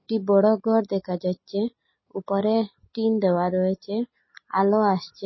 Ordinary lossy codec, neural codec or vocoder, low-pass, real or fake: MP3, 24 kbps; none; 7.2 kHz; real